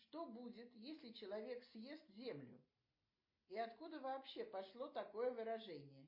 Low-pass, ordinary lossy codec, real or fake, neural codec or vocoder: 5.4 kHz; MP3, 32 kbps; real; none